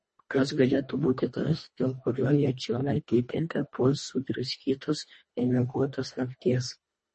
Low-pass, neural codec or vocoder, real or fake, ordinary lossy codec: 10.8 kHz; codec, 24 kHz, 1.5 kbps, HILCodec; fake; MP3, 32 kbps